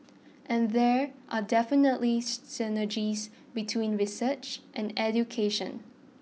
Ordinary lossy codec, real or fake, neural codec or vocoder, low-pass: none; real; none; none